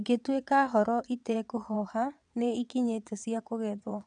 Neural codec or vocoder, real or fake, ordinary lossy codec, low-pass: vocoder, 22.05 kHz, 80 mel bands, WaveNeXt; fake; none; 9.9 kHz